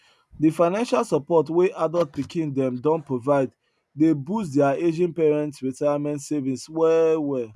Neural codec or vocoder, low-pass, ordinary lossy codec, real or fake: none; none; none; real